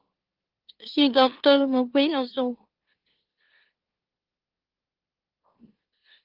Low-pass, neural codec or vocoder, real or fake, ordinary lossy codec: 5.4 kHz; autoencoder, 44.1 kHz, a latent of 192 numbers a frame, MeloTTS; fake; Opus, 16 kbps